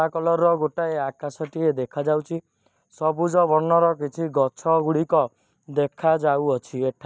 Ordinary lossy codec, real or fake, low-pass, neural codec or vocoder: none; real; none; none